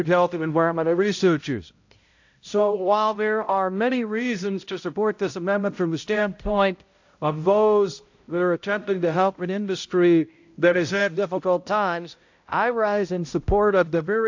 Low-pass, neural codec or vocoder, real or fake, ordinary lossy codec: 7.2 kHz; codec, 16 kHz, 0.5 kbps, X-Codec, HuBERT features, trained on balanced general audio; fake; AAC, 48 kbps